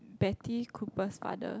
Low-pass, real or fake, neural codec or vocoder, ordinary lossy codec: none; real; none; none